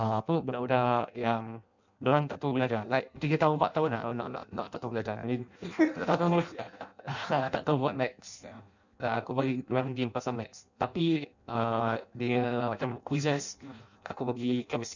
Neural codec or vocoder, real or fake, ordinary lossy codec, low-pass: codec, 16 kHz in and 24 kHz out, 0.6 kbps, FireRedTTS-2 codec; fake; none; 7.2 kHz